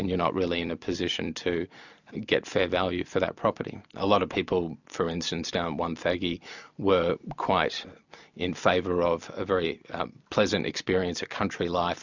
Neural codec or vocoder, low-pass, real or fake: none; 7.2 kHz; real